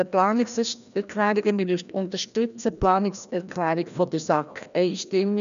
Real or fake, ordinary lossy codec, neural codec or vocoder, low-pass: fake; none; codec, 16 kHz, 1 kbps, FreqCodec, larger model; 7.2 kHz